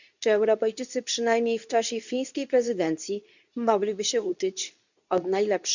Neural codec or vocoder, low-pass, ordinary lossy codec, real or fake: codec, 24 kHz, 0.9 kbps, WavTokenizer, medium speech release version 2; 7.2 kHz; none; fake